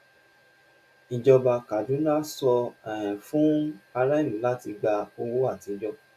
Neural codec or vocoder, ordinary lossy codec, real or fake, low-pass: none; AAC, 96 kbps; real; 14.4 kHz